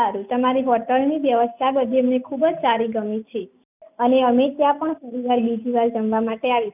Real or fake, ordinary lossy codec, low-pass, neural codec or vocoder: real; none; 3.6 kHz; none